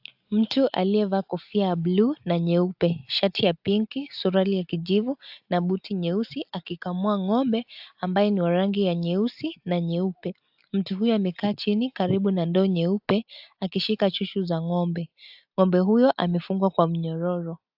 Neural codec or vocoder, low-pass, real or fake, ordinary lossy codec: none; 5.4 kHz; real; AAC, 48 kbps